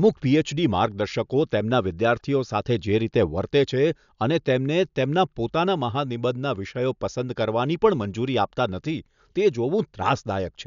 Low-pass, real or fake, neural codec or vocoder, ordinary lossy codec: 7.2 kHz; real; none; none